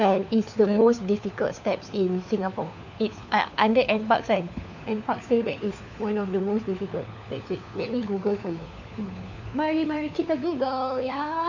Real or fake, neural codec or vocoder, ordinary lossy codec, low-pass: fake; codec, 16 kHz, 4 kbps, FunCodec, trained on LibriTTS, 50 frames a second; none; 7.2 kHz